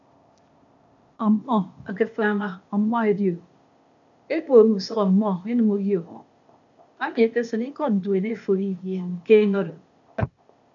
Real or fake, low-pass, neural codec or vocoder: fake; 7.2 kHz; codec, 16 kHz, 0.8 kbps, ZipCodec